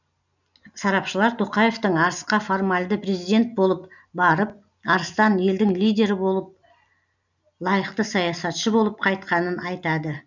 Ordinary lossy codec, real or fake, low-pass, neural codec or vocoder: none; real; 7.2 kHz; none